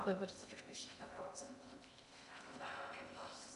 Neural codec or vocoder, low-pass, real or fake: codec, 16 kHz in and 24 kHz out, 0.6 kbps, FocalCodec, streaming, 2048 codes; 10.8 kHz; fake